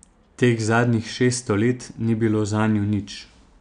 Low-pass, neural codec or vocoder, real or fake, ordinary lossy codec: 9.9 kHz; none; real; none